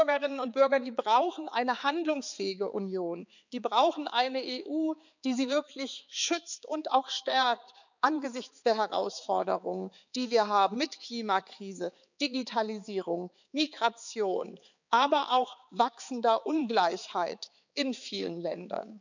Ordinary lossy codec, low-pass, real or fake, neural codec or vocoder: none; 7.2 kHz; fake; codec, 16 kHz, 4 kbps, X-Codec, HuBERT features, trained on balanced general audio